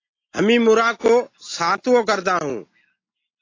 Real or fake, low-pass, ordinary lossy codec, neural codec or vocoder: real; 7.2 kHz; AAC, 32 kbps; none